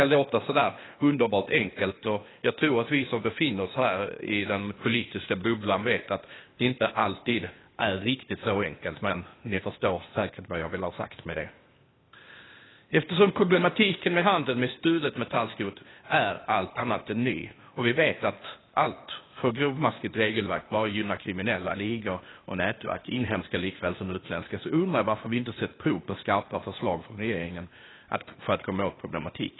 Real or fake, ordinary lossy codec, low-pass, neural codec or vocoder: fake; AAC, 16 kbps; 7.2 kHz; codec, 16 kHz, 0.8 kbps, ZipCodec